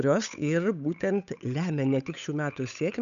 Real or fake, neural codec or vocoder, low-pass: fake; codec, 16 kHz, 8 kbps, FunCodec, trained on Chinese and English, 25 frames a second; 7.2 kHz